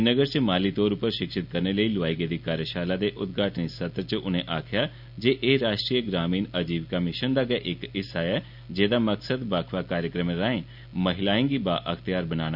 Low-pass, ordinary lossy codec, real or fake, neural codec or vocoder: 5.4 kHz; none; real; none